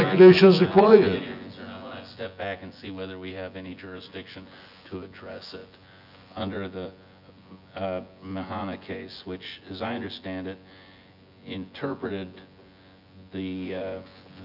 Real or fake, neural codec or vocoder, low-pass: fake; vocoder, 24 kHz, 100 mel bands, Vocos; 5.4 kHz